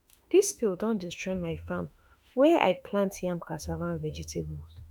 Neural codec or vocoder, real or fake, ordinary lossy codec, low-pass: autoencoder, 48 kHz, 32 numbers a frame, DAC-VAE, trained on Japanese speech; fake; none; none